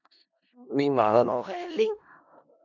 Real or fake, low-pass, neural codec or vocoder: fake; 7.2 kHz; codec, 16 kHz in and 24 kHz out, 0.4 kbps, LongCat-Audio-Codec, four codebook decoder